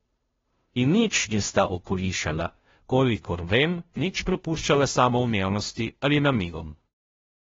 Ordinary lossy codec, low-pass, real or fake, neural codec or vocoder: AAC, 24 kbps; 7.2 kHz; fake; codec, 16 kHz, 0.5 kbps, FunCodec, trained on Chinese and English, 25 frames a second